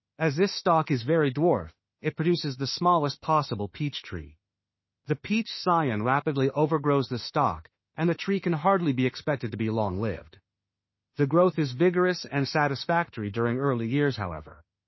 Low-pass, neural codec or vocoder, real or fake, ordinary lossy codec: 7.2 kHz; autoencoder, 48 kHz, 32 numbers a frame, DAC-VAE, trained on Japanese speech; fake; MP3, 24 kbps